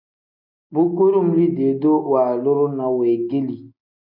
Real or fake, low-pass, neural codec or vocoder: real; 5.4 kHz; none